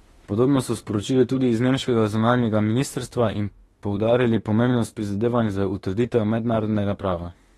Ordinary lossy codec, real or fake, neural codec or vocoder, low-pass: AAC, 32 kbps; fake; autoencoder, 48 kHz, 32 numbers a frame, DAC-VAE, trained on Japanese speech; 19.8 kHz